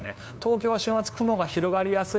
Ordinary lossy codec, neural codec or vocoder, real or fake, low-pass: none; codec, 16 kHz, 2 kbps, FunCodec, trained on LibriTTS, 25 frames a second; fake; none